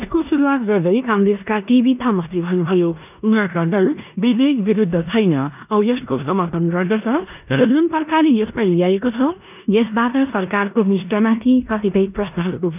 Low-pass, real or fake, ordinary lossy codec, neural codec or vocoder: 3.6 kHz; fake; none; codec, 16 kHz in and 24 kHz out, 0.9 kbps, LongCat-Audio-Codec, four codebook decoder